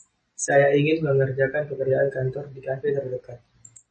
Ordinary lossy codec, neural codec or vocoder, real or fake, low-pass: MP3, 32 kbps; none; real; 9.9 kHz